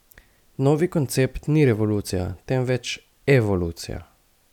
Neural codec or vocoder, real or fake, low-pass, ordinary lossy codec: none; real; 19.8 kHz; none